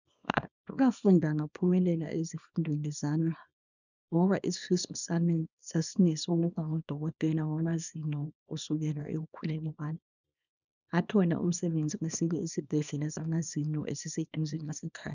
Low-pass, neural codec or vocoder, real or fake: 7.2 kHz; codec, 24 kHz, 0.9 kbps, WavTokenizer, small release; fake